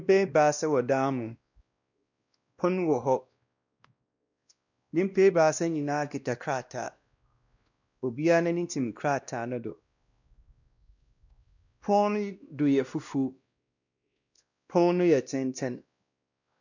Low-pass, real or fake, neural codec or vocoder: 7.2 kHz; fake; codec, 16 kHz, 1 kbps, X-Codec, WavLM features, trained on Multilingual LibriSpeech